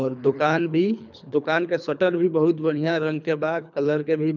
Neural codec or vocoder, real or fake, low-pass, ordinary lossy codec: codec, 24 kHz, 3 kbps, HILCodec; fake; 7.2 kHz; none